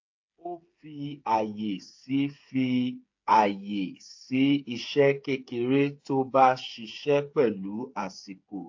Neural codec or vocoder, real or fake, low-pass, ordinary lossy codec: codec, 16 kHz, 8 kbps, FreqCodec, smaller model; fake; 7.2 kHz; AAC, 48 kbps